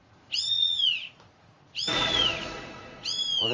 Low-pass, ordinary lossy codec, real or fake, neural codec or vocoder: 7.2 kHz; Opus, 32 kbps; fake; vocoder, 44.1 kHz, 80 mel bands, Vocos